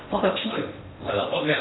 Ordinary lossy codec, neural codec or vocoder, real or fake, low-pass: AAC, 16 kbps; codec, 16 kHz in and 24 kHz out, 0.6 kbps, FocalCodec, streaming, 4096 codes; fake; 7.2 kHz